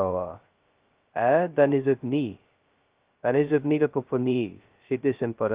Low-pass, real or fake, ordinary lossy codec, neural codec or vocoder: 3.6 kHz; fake; Opus, 32 kbps; codec, 16 kHz, 0.2 kbps, FocalCodec